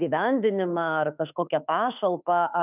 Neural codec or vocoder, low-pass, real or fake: autoencoder, 48 kHz, 32 numbers a frame, DAC-VAE, trained on Japanese speech; 3.6 kHz; fake